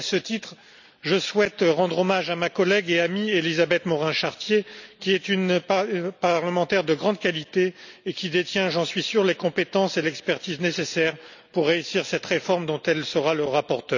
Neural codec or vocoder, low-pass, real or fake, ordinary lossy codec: none; 7.2 kHz; real; none